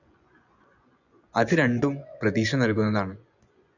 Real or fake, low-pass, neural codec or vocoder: fake; 7.2 kHz; vocoder, 24 kHz, 100 mel bands, Vocos